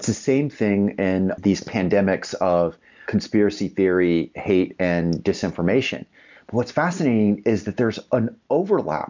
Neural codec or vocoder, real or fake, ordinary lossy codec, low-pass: none; real; MP3, 64 kbps; 7.2 kHz